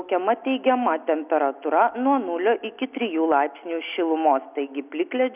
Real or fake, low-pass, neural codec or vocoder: real; 3.6 kHz; none